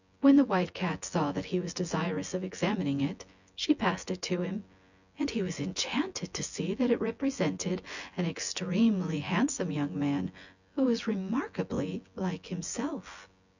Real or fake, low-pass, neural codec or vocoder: fake; 7.2 kHz; vocoder, 24 kHz, 100 mel bands, Vocos